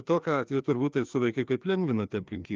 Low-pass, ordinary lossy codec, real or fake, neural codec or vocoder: 7.2 kHz; Opus, 32 kbps; fake; codec, 16 kHz, 2 kbps, FreqCodec, larger model